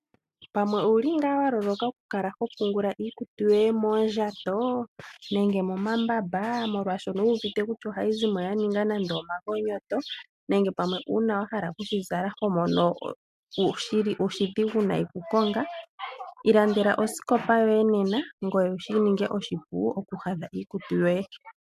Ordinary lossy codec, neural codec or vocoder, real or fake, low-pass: MP3, 96 kbps; none; real; 14.4 kHz